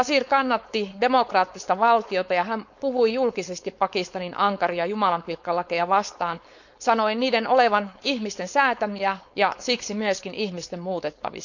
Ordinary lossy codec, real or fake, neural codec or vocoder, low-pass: none; fake; codec, 16 kHz, 4.8 kbps, FACodec; 7.2 kHz